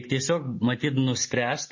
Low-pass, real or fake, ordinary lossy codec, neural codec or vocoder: 7.2 kHz; real; MP3, 32 kbps; none